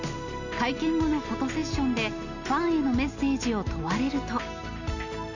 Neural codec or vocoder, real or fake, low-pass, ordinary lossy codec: none; real; 7.2 kHz; none